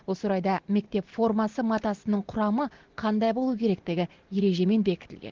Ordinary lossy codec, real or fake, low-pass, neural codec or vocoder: Opus, 16 kbps; real; 7.2 kHz; none